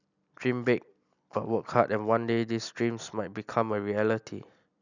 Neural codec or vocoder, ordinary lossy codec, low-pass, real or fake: none; none; 7.2 kHz; real